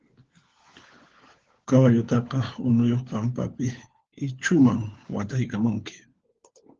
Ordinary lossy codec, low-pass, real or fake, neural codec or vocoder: Opus, 16 kbps; 7.2 kHz; fake; codec, 16 kHz, 4 kbps, FunCodec, trained on LibriTTS, 50 frames a second